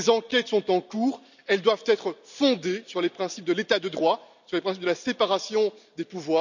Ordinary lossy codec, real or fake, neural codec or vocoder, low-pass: none; real; none; 7.2 kHz